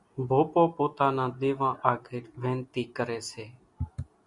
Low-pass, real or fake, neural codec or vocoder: 10.8 kHz; real; none